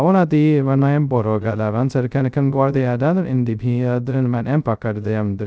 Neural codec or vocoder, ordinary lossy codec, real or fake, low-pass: codec, 16 kHz, 0.2 kbps, FocalCodec; none; fake; none